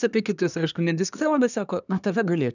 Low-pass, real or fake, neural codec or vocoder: 7.2 kHz; fake; codec, 24 kHz, 1 kbps, SNAC